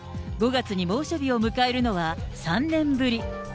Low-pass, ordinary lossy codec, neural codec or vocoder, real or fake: none; none; none; real